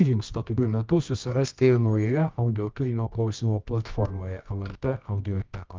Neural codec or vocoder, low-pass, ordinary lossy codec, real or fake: codec, 24 kHz, 0.9 kbps, WavTokenizer, medium music audio release; 7.2 kHz; Opus, 24 kbps; fake